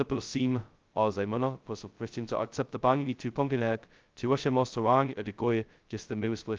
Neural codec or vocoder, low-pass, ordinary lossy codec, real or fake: codec, 16 kHz, 0.2 kbps, FocalCodec; 7.2 kHz; Opus, 24 kbps; fake